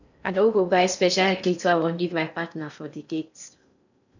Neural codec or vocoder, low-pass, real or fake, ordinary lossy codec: codec, 16 kHz in and 24 kHz out, 0.6 kbps, FocalCodec, streaming, 4096 codes; 7.2 kHz; fake; none